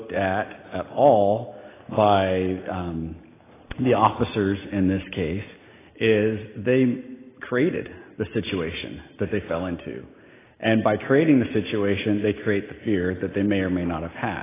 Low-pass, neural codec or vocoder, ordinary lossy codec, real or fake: 3.6 kHz; none; AAC, 16 kbps; real